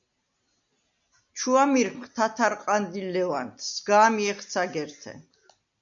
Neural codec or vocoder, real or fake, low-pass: none; real; 7.2 kHz